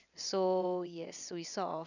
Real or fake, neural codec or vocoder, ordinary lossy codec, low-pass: fake; vocoder, 22.05 kHz, 80 mel bands, WaveNeXt; none; 7.2 kHz